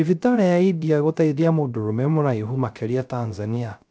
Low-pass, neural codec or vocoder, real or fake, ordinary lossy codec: none; codec, 16 kHz, 0.3 kbps, FocalCodec; fake; none